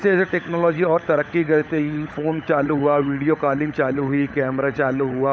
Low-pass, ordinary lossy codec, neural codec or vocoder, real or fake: none; none; codec, 16 kHz, 16 kbps, FunCodec, trained on LibriTTS, 50 frames a second; fake